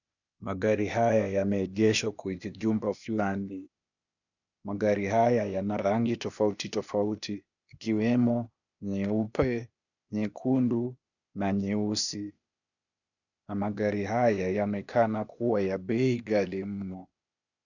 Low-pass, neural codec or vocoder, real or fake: 7.2 kHz; codec, 16 kHz, 0.8 kbps, ZipCodec; fake